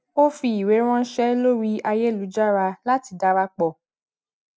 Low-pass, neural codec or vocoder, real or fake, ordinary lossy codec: none; none; real; none